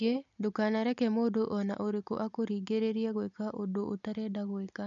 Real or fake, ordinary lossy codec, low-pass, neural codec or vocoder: real; none; 7.2 kHz; none